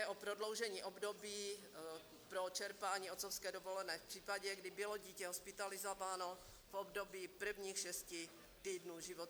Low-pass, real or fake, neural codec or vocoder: 19.8 kHz; real; none